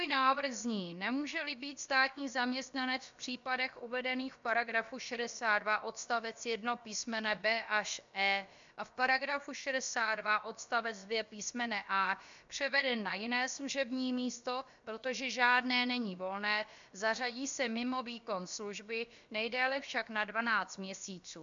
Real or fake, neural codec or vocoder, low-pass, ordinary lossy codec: fake; codec, 16 kHz, about 1 kbps, DyCAST, with the encoder's durations; 7.2 kHz; MP3, 64 kbps